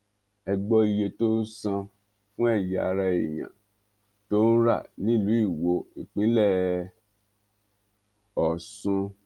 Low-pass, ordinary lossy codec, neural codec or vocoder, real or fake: 19.8 kHz; Opus, 32 kbps; none; real